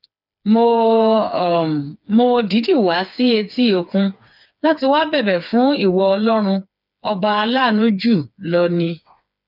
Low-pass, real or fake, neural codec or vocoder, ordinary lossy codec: 5.4 kHz; fake; codec, 16 kHz, 4 kbps, FreqCodec, smaller model; none